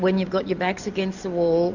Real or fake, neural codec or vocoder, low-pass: real; none; 7.2 kHz